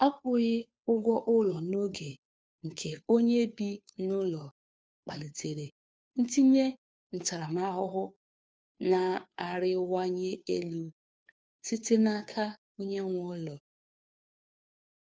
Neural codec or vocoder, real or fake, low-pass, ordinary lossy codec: codec, 16 kHz, 2 kbps, FunCodec, trained on Chinese and English, 25 frames a second; fake; none; none